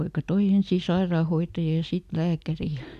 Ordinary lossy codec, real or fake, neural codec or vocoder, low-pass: none; real; none; 14.4 kHz